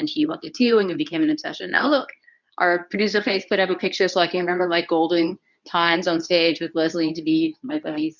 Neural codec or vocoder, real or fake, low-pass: codec, 24 kHz, 0.9 kbps, WavTokenizer, medium speech release version 2; fake; 7.2 kHz